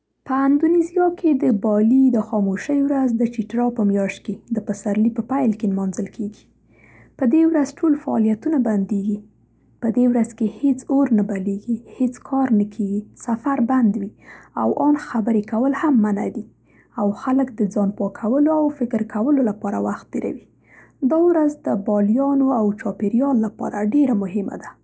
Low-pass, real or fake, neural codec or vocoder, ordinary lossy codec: none; real; none; none